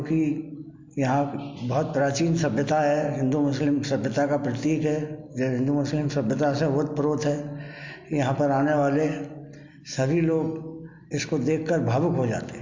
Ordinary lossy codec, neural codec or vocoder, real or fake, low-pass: MP3, 48 kbps; none; real; 7.2 kHz